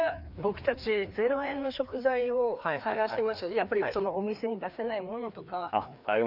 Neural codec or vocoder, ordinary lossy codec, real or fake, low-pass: codec, 16 kHz, 2 kbps, FreqCodec, larger model; none; fake; 5.4 kHz